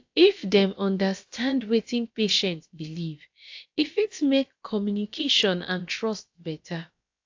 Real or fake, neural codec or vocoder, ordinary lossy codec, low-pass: fake; codec, 16 kHz, about 1 kbps, DyCAST, with the encoder's durations; AAC, 48 kbps; 7.2 kHz